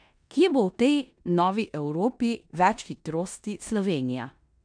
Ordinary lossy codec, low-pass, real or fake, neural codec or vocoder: none; 9.9 kHz; fake; codec, 16 kHz in and 24 kHz out, 0.9 kbps, LongCat-Audio-Codec, four codebook decoder